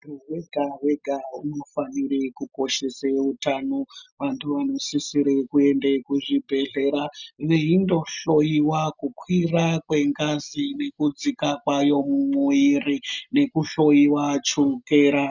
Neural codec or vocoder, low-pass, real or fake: none; 7.2 kHz; real